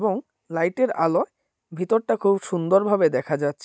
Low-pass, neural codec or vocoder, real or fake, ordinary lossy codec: none; none; real; none